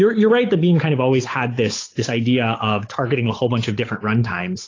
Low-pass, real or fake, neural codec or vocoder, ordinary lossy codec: 7.2 kHz; fake; codec, 16 kHz, 8 kbps, FunCodec, trained on Chinese and English, 25 frames a second; AAC, 32 kbps